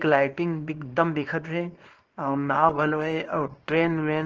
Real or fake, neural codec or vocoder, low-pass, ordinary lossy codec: fake; codec, 24 kHz, 0.9 kbps, WavTokenizer, small release; 7.2 kHz; Opus, 16 kbps